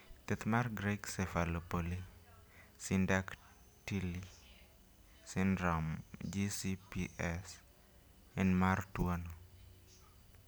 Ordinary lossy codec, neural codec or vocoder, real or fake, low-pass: none; none; real; none